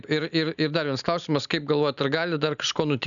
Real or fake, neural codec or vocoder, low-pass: real; none; 7.2 kHz